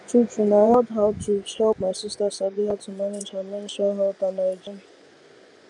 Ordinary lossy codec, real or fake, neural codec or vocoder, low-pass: none; fake; vocoder, 24 kHz, 100 mel bands, Vocos; 10.8 kHz